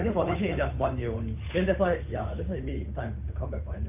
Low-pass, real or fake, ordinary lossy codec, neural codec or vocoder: 3.6 kHz; fake; MP3, 24 kbps; codec, 16 kHz, 8 kbps, FunCodec, trained on Chinese and English, 25 frames a second